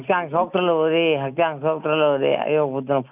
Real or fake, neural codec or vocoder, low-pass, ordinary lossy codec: real; none; 3.6 kHz; none